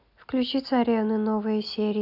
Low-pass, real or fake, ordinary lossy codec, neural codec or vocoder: 5.4 kHz; real; none; none